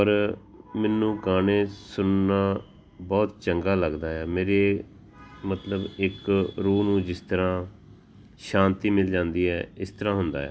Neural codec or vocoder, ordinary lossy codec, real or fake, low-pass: none; none; real; none